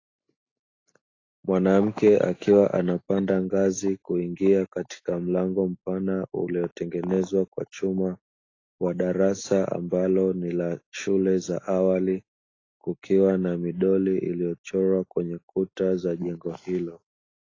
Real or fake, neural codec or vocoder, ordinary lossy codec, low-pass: real; none; AAC, 32 kbps; 7.2 kHz